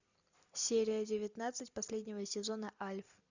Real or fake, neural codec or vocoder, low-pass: real; none; 7.2 kHz